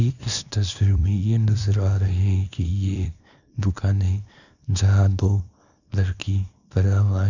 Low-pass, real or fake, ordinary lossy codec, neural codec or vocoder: 7.2 kHz; fake; none; codec, 24 kHz, 0.9 kbps, WavTokenizer, small release